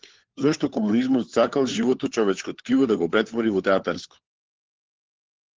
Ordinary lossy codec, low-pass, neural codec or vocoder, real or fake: Opus, 16 kbps; 7.2 kHz; codec, 16 kHz, 4 kbps, FunCodec, trained on LibriTTS, 50 frames a second; fake